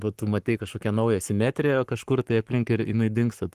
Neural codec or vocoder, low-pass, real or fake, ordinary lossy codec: codec, 44.1 kHz, 7.8 kbps, Pupu-Codec; 14.4 kHz; fake; Opus, 24 kbps